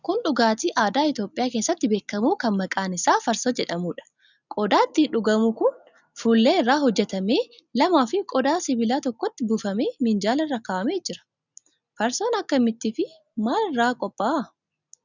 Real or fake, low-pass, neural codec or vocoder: real; 7.2 kHz; none